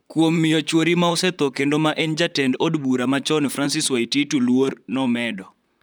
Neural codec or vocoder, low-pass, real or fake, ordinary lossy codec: vocoder, 44.1 kHz, 128 mel bands, Pupu-Vocoder; none; fake; none